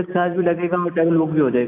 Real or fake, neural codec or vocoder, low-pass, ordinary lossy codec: real; none; 3.6 kHz; AAC, 24 kbps